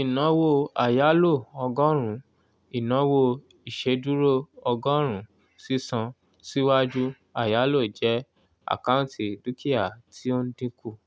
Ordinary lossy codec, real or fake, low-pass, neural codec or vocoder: none; real; none; none